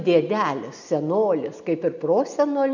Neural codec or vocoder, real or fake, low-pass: none; real; 7.2 kHz